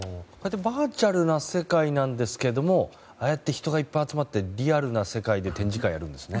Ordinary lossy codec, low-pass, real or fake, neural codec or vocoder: none; none; real; none